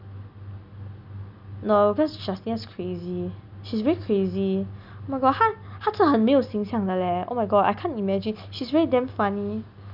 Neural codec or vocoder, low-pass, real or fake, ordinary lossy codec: none; 5.4 kHz; real; none